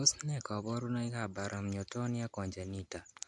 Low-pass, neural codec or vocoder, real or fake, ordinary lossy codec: 10.8 kHz; none; real; MP3, 64 kbps